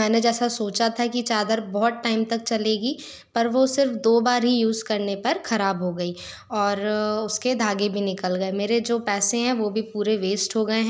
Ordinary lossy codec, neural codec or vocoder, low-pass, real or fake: none; none; none; real